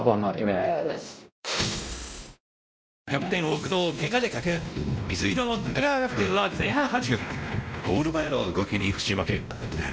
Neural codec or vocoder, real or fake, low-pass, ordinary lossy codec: codec, 16 kHz, 1 kbps, X-Codec, WavLM features, trained on Multilingual LibriSpeech; fake; none; none